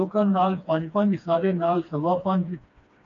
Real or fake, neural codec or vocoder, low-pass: fake; codec, 16 kHz, 2 kbps, FreqCodec, smaller model; 7.2 kHz